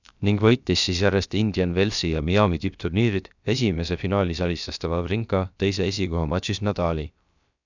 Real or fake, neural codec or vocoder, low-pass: fake; codec, 16 kHz, about 1 kbps, DyCAST, with the encoder's durations; 7.2 kHz